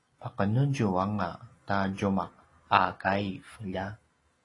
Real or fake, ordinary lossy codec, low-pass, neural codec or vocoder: real; AAC, 32 kbps; 10.8 kHz; none